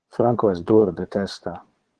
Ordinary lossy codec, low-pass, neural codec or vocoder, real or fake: Opus, 16 kbps; 9.9 kHz; vocoder, 22.05 kHz, 80 mel bands, Vocos; fake